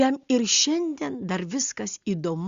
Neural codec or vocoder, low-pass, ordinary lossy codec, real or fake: none; 7.2 kHz; Opus, 64 kbps; real